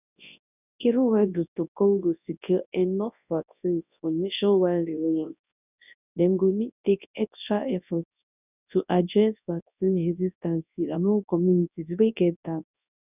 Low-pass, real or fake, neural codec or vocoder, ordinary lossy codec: 3.6 kHz; fake; codec, 24 kHz, 0.9 kbps, WavTokenizer, large speech release; none